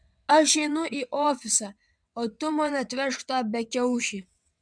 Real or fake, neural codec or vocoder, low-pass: fake; vocoder, 22.05 kHz, 80 mel bands, WaveNeXt; 9.9 kHz